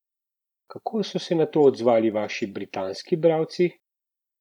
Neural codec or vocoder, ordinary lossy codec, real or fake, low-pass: vocoder, 44.1 kHz, 128 mel bands every 512 samples, BigVGAN v2; none; fake; 19.8 kHz